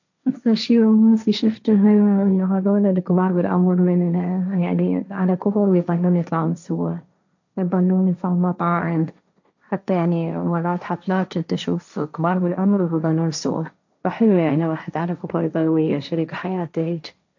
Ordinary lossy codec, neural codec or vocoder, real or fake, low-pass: none; codec, 16 kHz, 1.1 kbps, Voila-Tokenizer; fake; 7.2 kHz